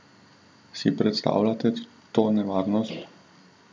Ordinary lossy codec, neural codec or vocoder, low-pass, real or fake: none; none; none; real